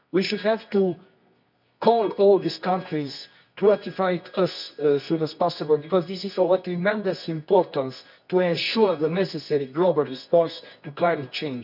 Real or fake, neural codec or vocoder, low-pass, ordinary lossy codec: fake; codec, 24 kHz, 0.9 kbps, WavTokenizer, medium music audio release; 5.4 kHz; none